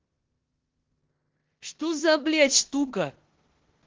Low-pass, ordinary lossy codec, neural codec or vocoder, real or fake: 7.2 kHz; Opus, 16 kbps; codec, 16 kHz in and 24 kHz out, 0.9 kbps, LongCat-Audio-Codec, four codebook decoder; fake